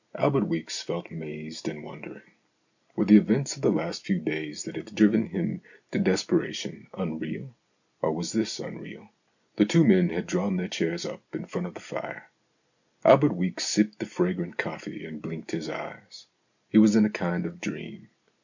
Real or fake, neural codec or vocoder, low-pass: real; none; 7.2 kHz